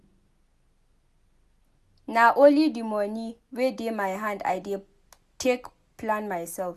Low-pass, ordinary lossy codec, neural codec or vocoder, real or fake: 14.4 kHz; none; none; real